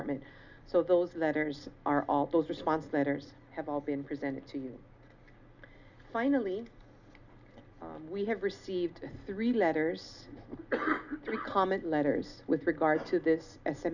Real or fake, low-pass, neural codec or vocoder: real; 7.2 kHz; none